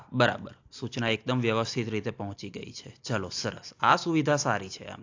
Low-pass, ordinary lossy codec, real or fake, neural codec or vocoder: 7.2 kHz; AAC, 48 kbps; real; none